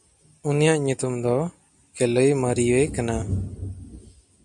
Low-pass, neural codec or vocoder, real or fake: 10.8 kHz; none; real